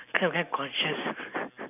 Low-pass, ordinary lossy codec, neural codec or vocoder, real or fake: 3.6 kHz; none; none; real